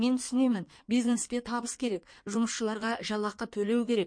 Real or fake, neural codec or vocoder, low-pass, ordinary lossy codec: fake; codec, 16 kHz in and 24 kHz out, 1.1 kbps, FireRedTTS-2 codec; 9.9 kHz; MP3, 48 kbps